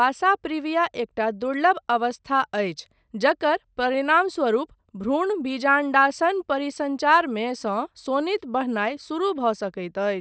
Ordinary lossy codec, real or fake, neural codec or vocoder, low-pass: none; real; none; none